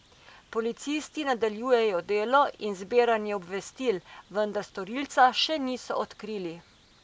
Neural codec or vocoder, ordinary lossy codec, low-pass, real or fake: none; none; none; real